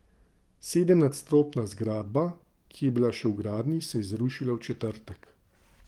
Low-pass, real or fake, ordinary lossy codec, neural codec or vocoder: 19.8 kHz; fake; Opus, 24 kbps; codec, 44.1 kHz, 7.8 kbps, DAC